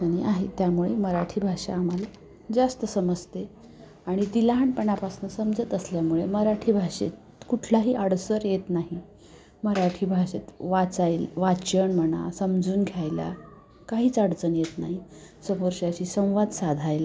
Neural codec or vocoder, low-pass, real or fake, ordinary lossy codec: none; none; real; none